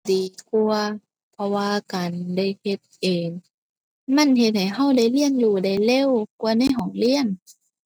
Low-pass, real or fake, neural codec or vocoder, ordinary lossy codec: none; real; none; none